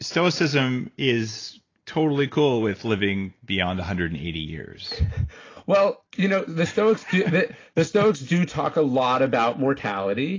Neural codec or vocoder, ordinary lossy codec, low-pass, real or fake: none; AAC, 32 kbps; 7.2 kHz; real